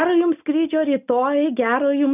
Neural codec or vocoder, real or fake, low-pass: none; real; 3.6 kHz